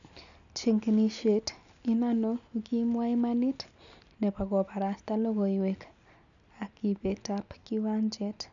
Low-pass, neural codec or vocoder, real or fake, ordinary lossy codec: 7.2 kHz; none; real; none